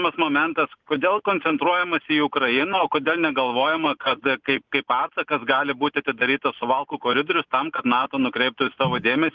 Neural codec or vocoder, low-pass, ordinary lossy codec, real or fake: none; 7.2 kHz; Opus, 32 kbps; real